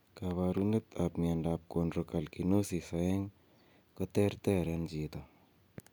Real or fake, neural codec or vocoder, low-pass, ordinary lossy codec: real; none; none; none